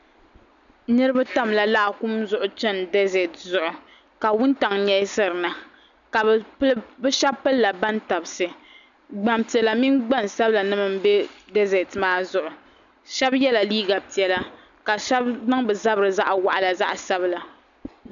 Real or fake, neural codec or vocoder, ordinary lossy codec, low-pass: real; none; MP3, 96 kbps; 7.2 kHz